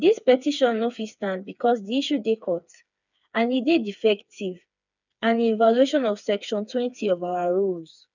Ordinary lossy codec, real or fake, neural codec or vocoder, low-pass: none; fake; codec, 16 kHz, 4 kbps, FreqCodec, smaller model; 7.2 kHz